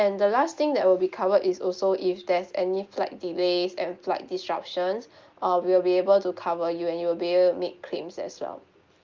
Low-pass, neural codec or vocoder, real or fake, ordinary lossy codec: 7.2 kHz; none; real; Opus, 32 kbps